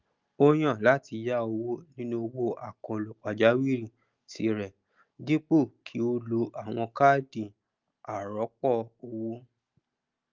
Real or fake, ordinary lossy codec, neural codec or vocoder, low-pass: real; Opus, 32 kbps; none; 7.2 kHz